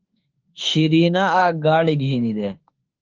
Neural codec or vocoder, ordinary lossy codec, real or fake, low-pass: autoencoder, 48 kHz, 32 numbers a frame, DAC-VAE, trained on Japanese speech; Opus, 16 kbps; fake; 7.2 kHz